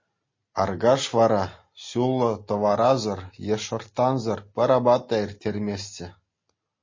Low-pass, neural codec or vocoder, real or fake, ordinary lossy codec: 7.2 kHz; none; real; MP3, 32 kbps